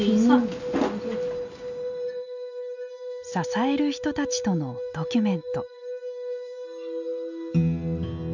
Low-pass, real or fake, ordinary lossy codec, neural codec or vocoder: 7.2 kHz; real; none; none